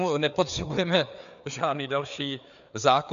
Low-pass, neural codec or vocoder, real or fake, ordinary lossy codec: 7.2 kHz; codec, 16 kHz, 4 kbps, FreqCodec, larger model; fake; AAC, 96 kbps